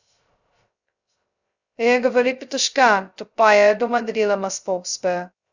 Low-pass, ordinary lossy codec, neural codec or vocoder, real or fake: 7.2 kHz; Opus, 64 kbps; codec, 16 kHz, 0.2 kbps, FocalCodec; fake